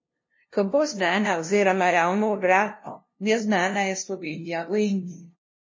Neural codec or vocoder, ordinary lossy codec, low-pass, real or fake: codec, 16 kHz, 0.5 kbps, FunCodec, trained on LibriTTS, 25 frames a second; MP3, 32 kbps; 7.2 kHz; fake